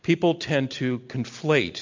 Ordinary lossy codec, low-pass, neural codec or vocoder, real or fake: MP3, 64 kbps; 7.2 kHz; none; real